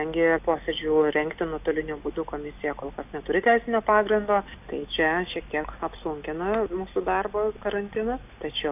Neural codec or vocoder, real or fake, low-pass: none; real; 3.6 kHz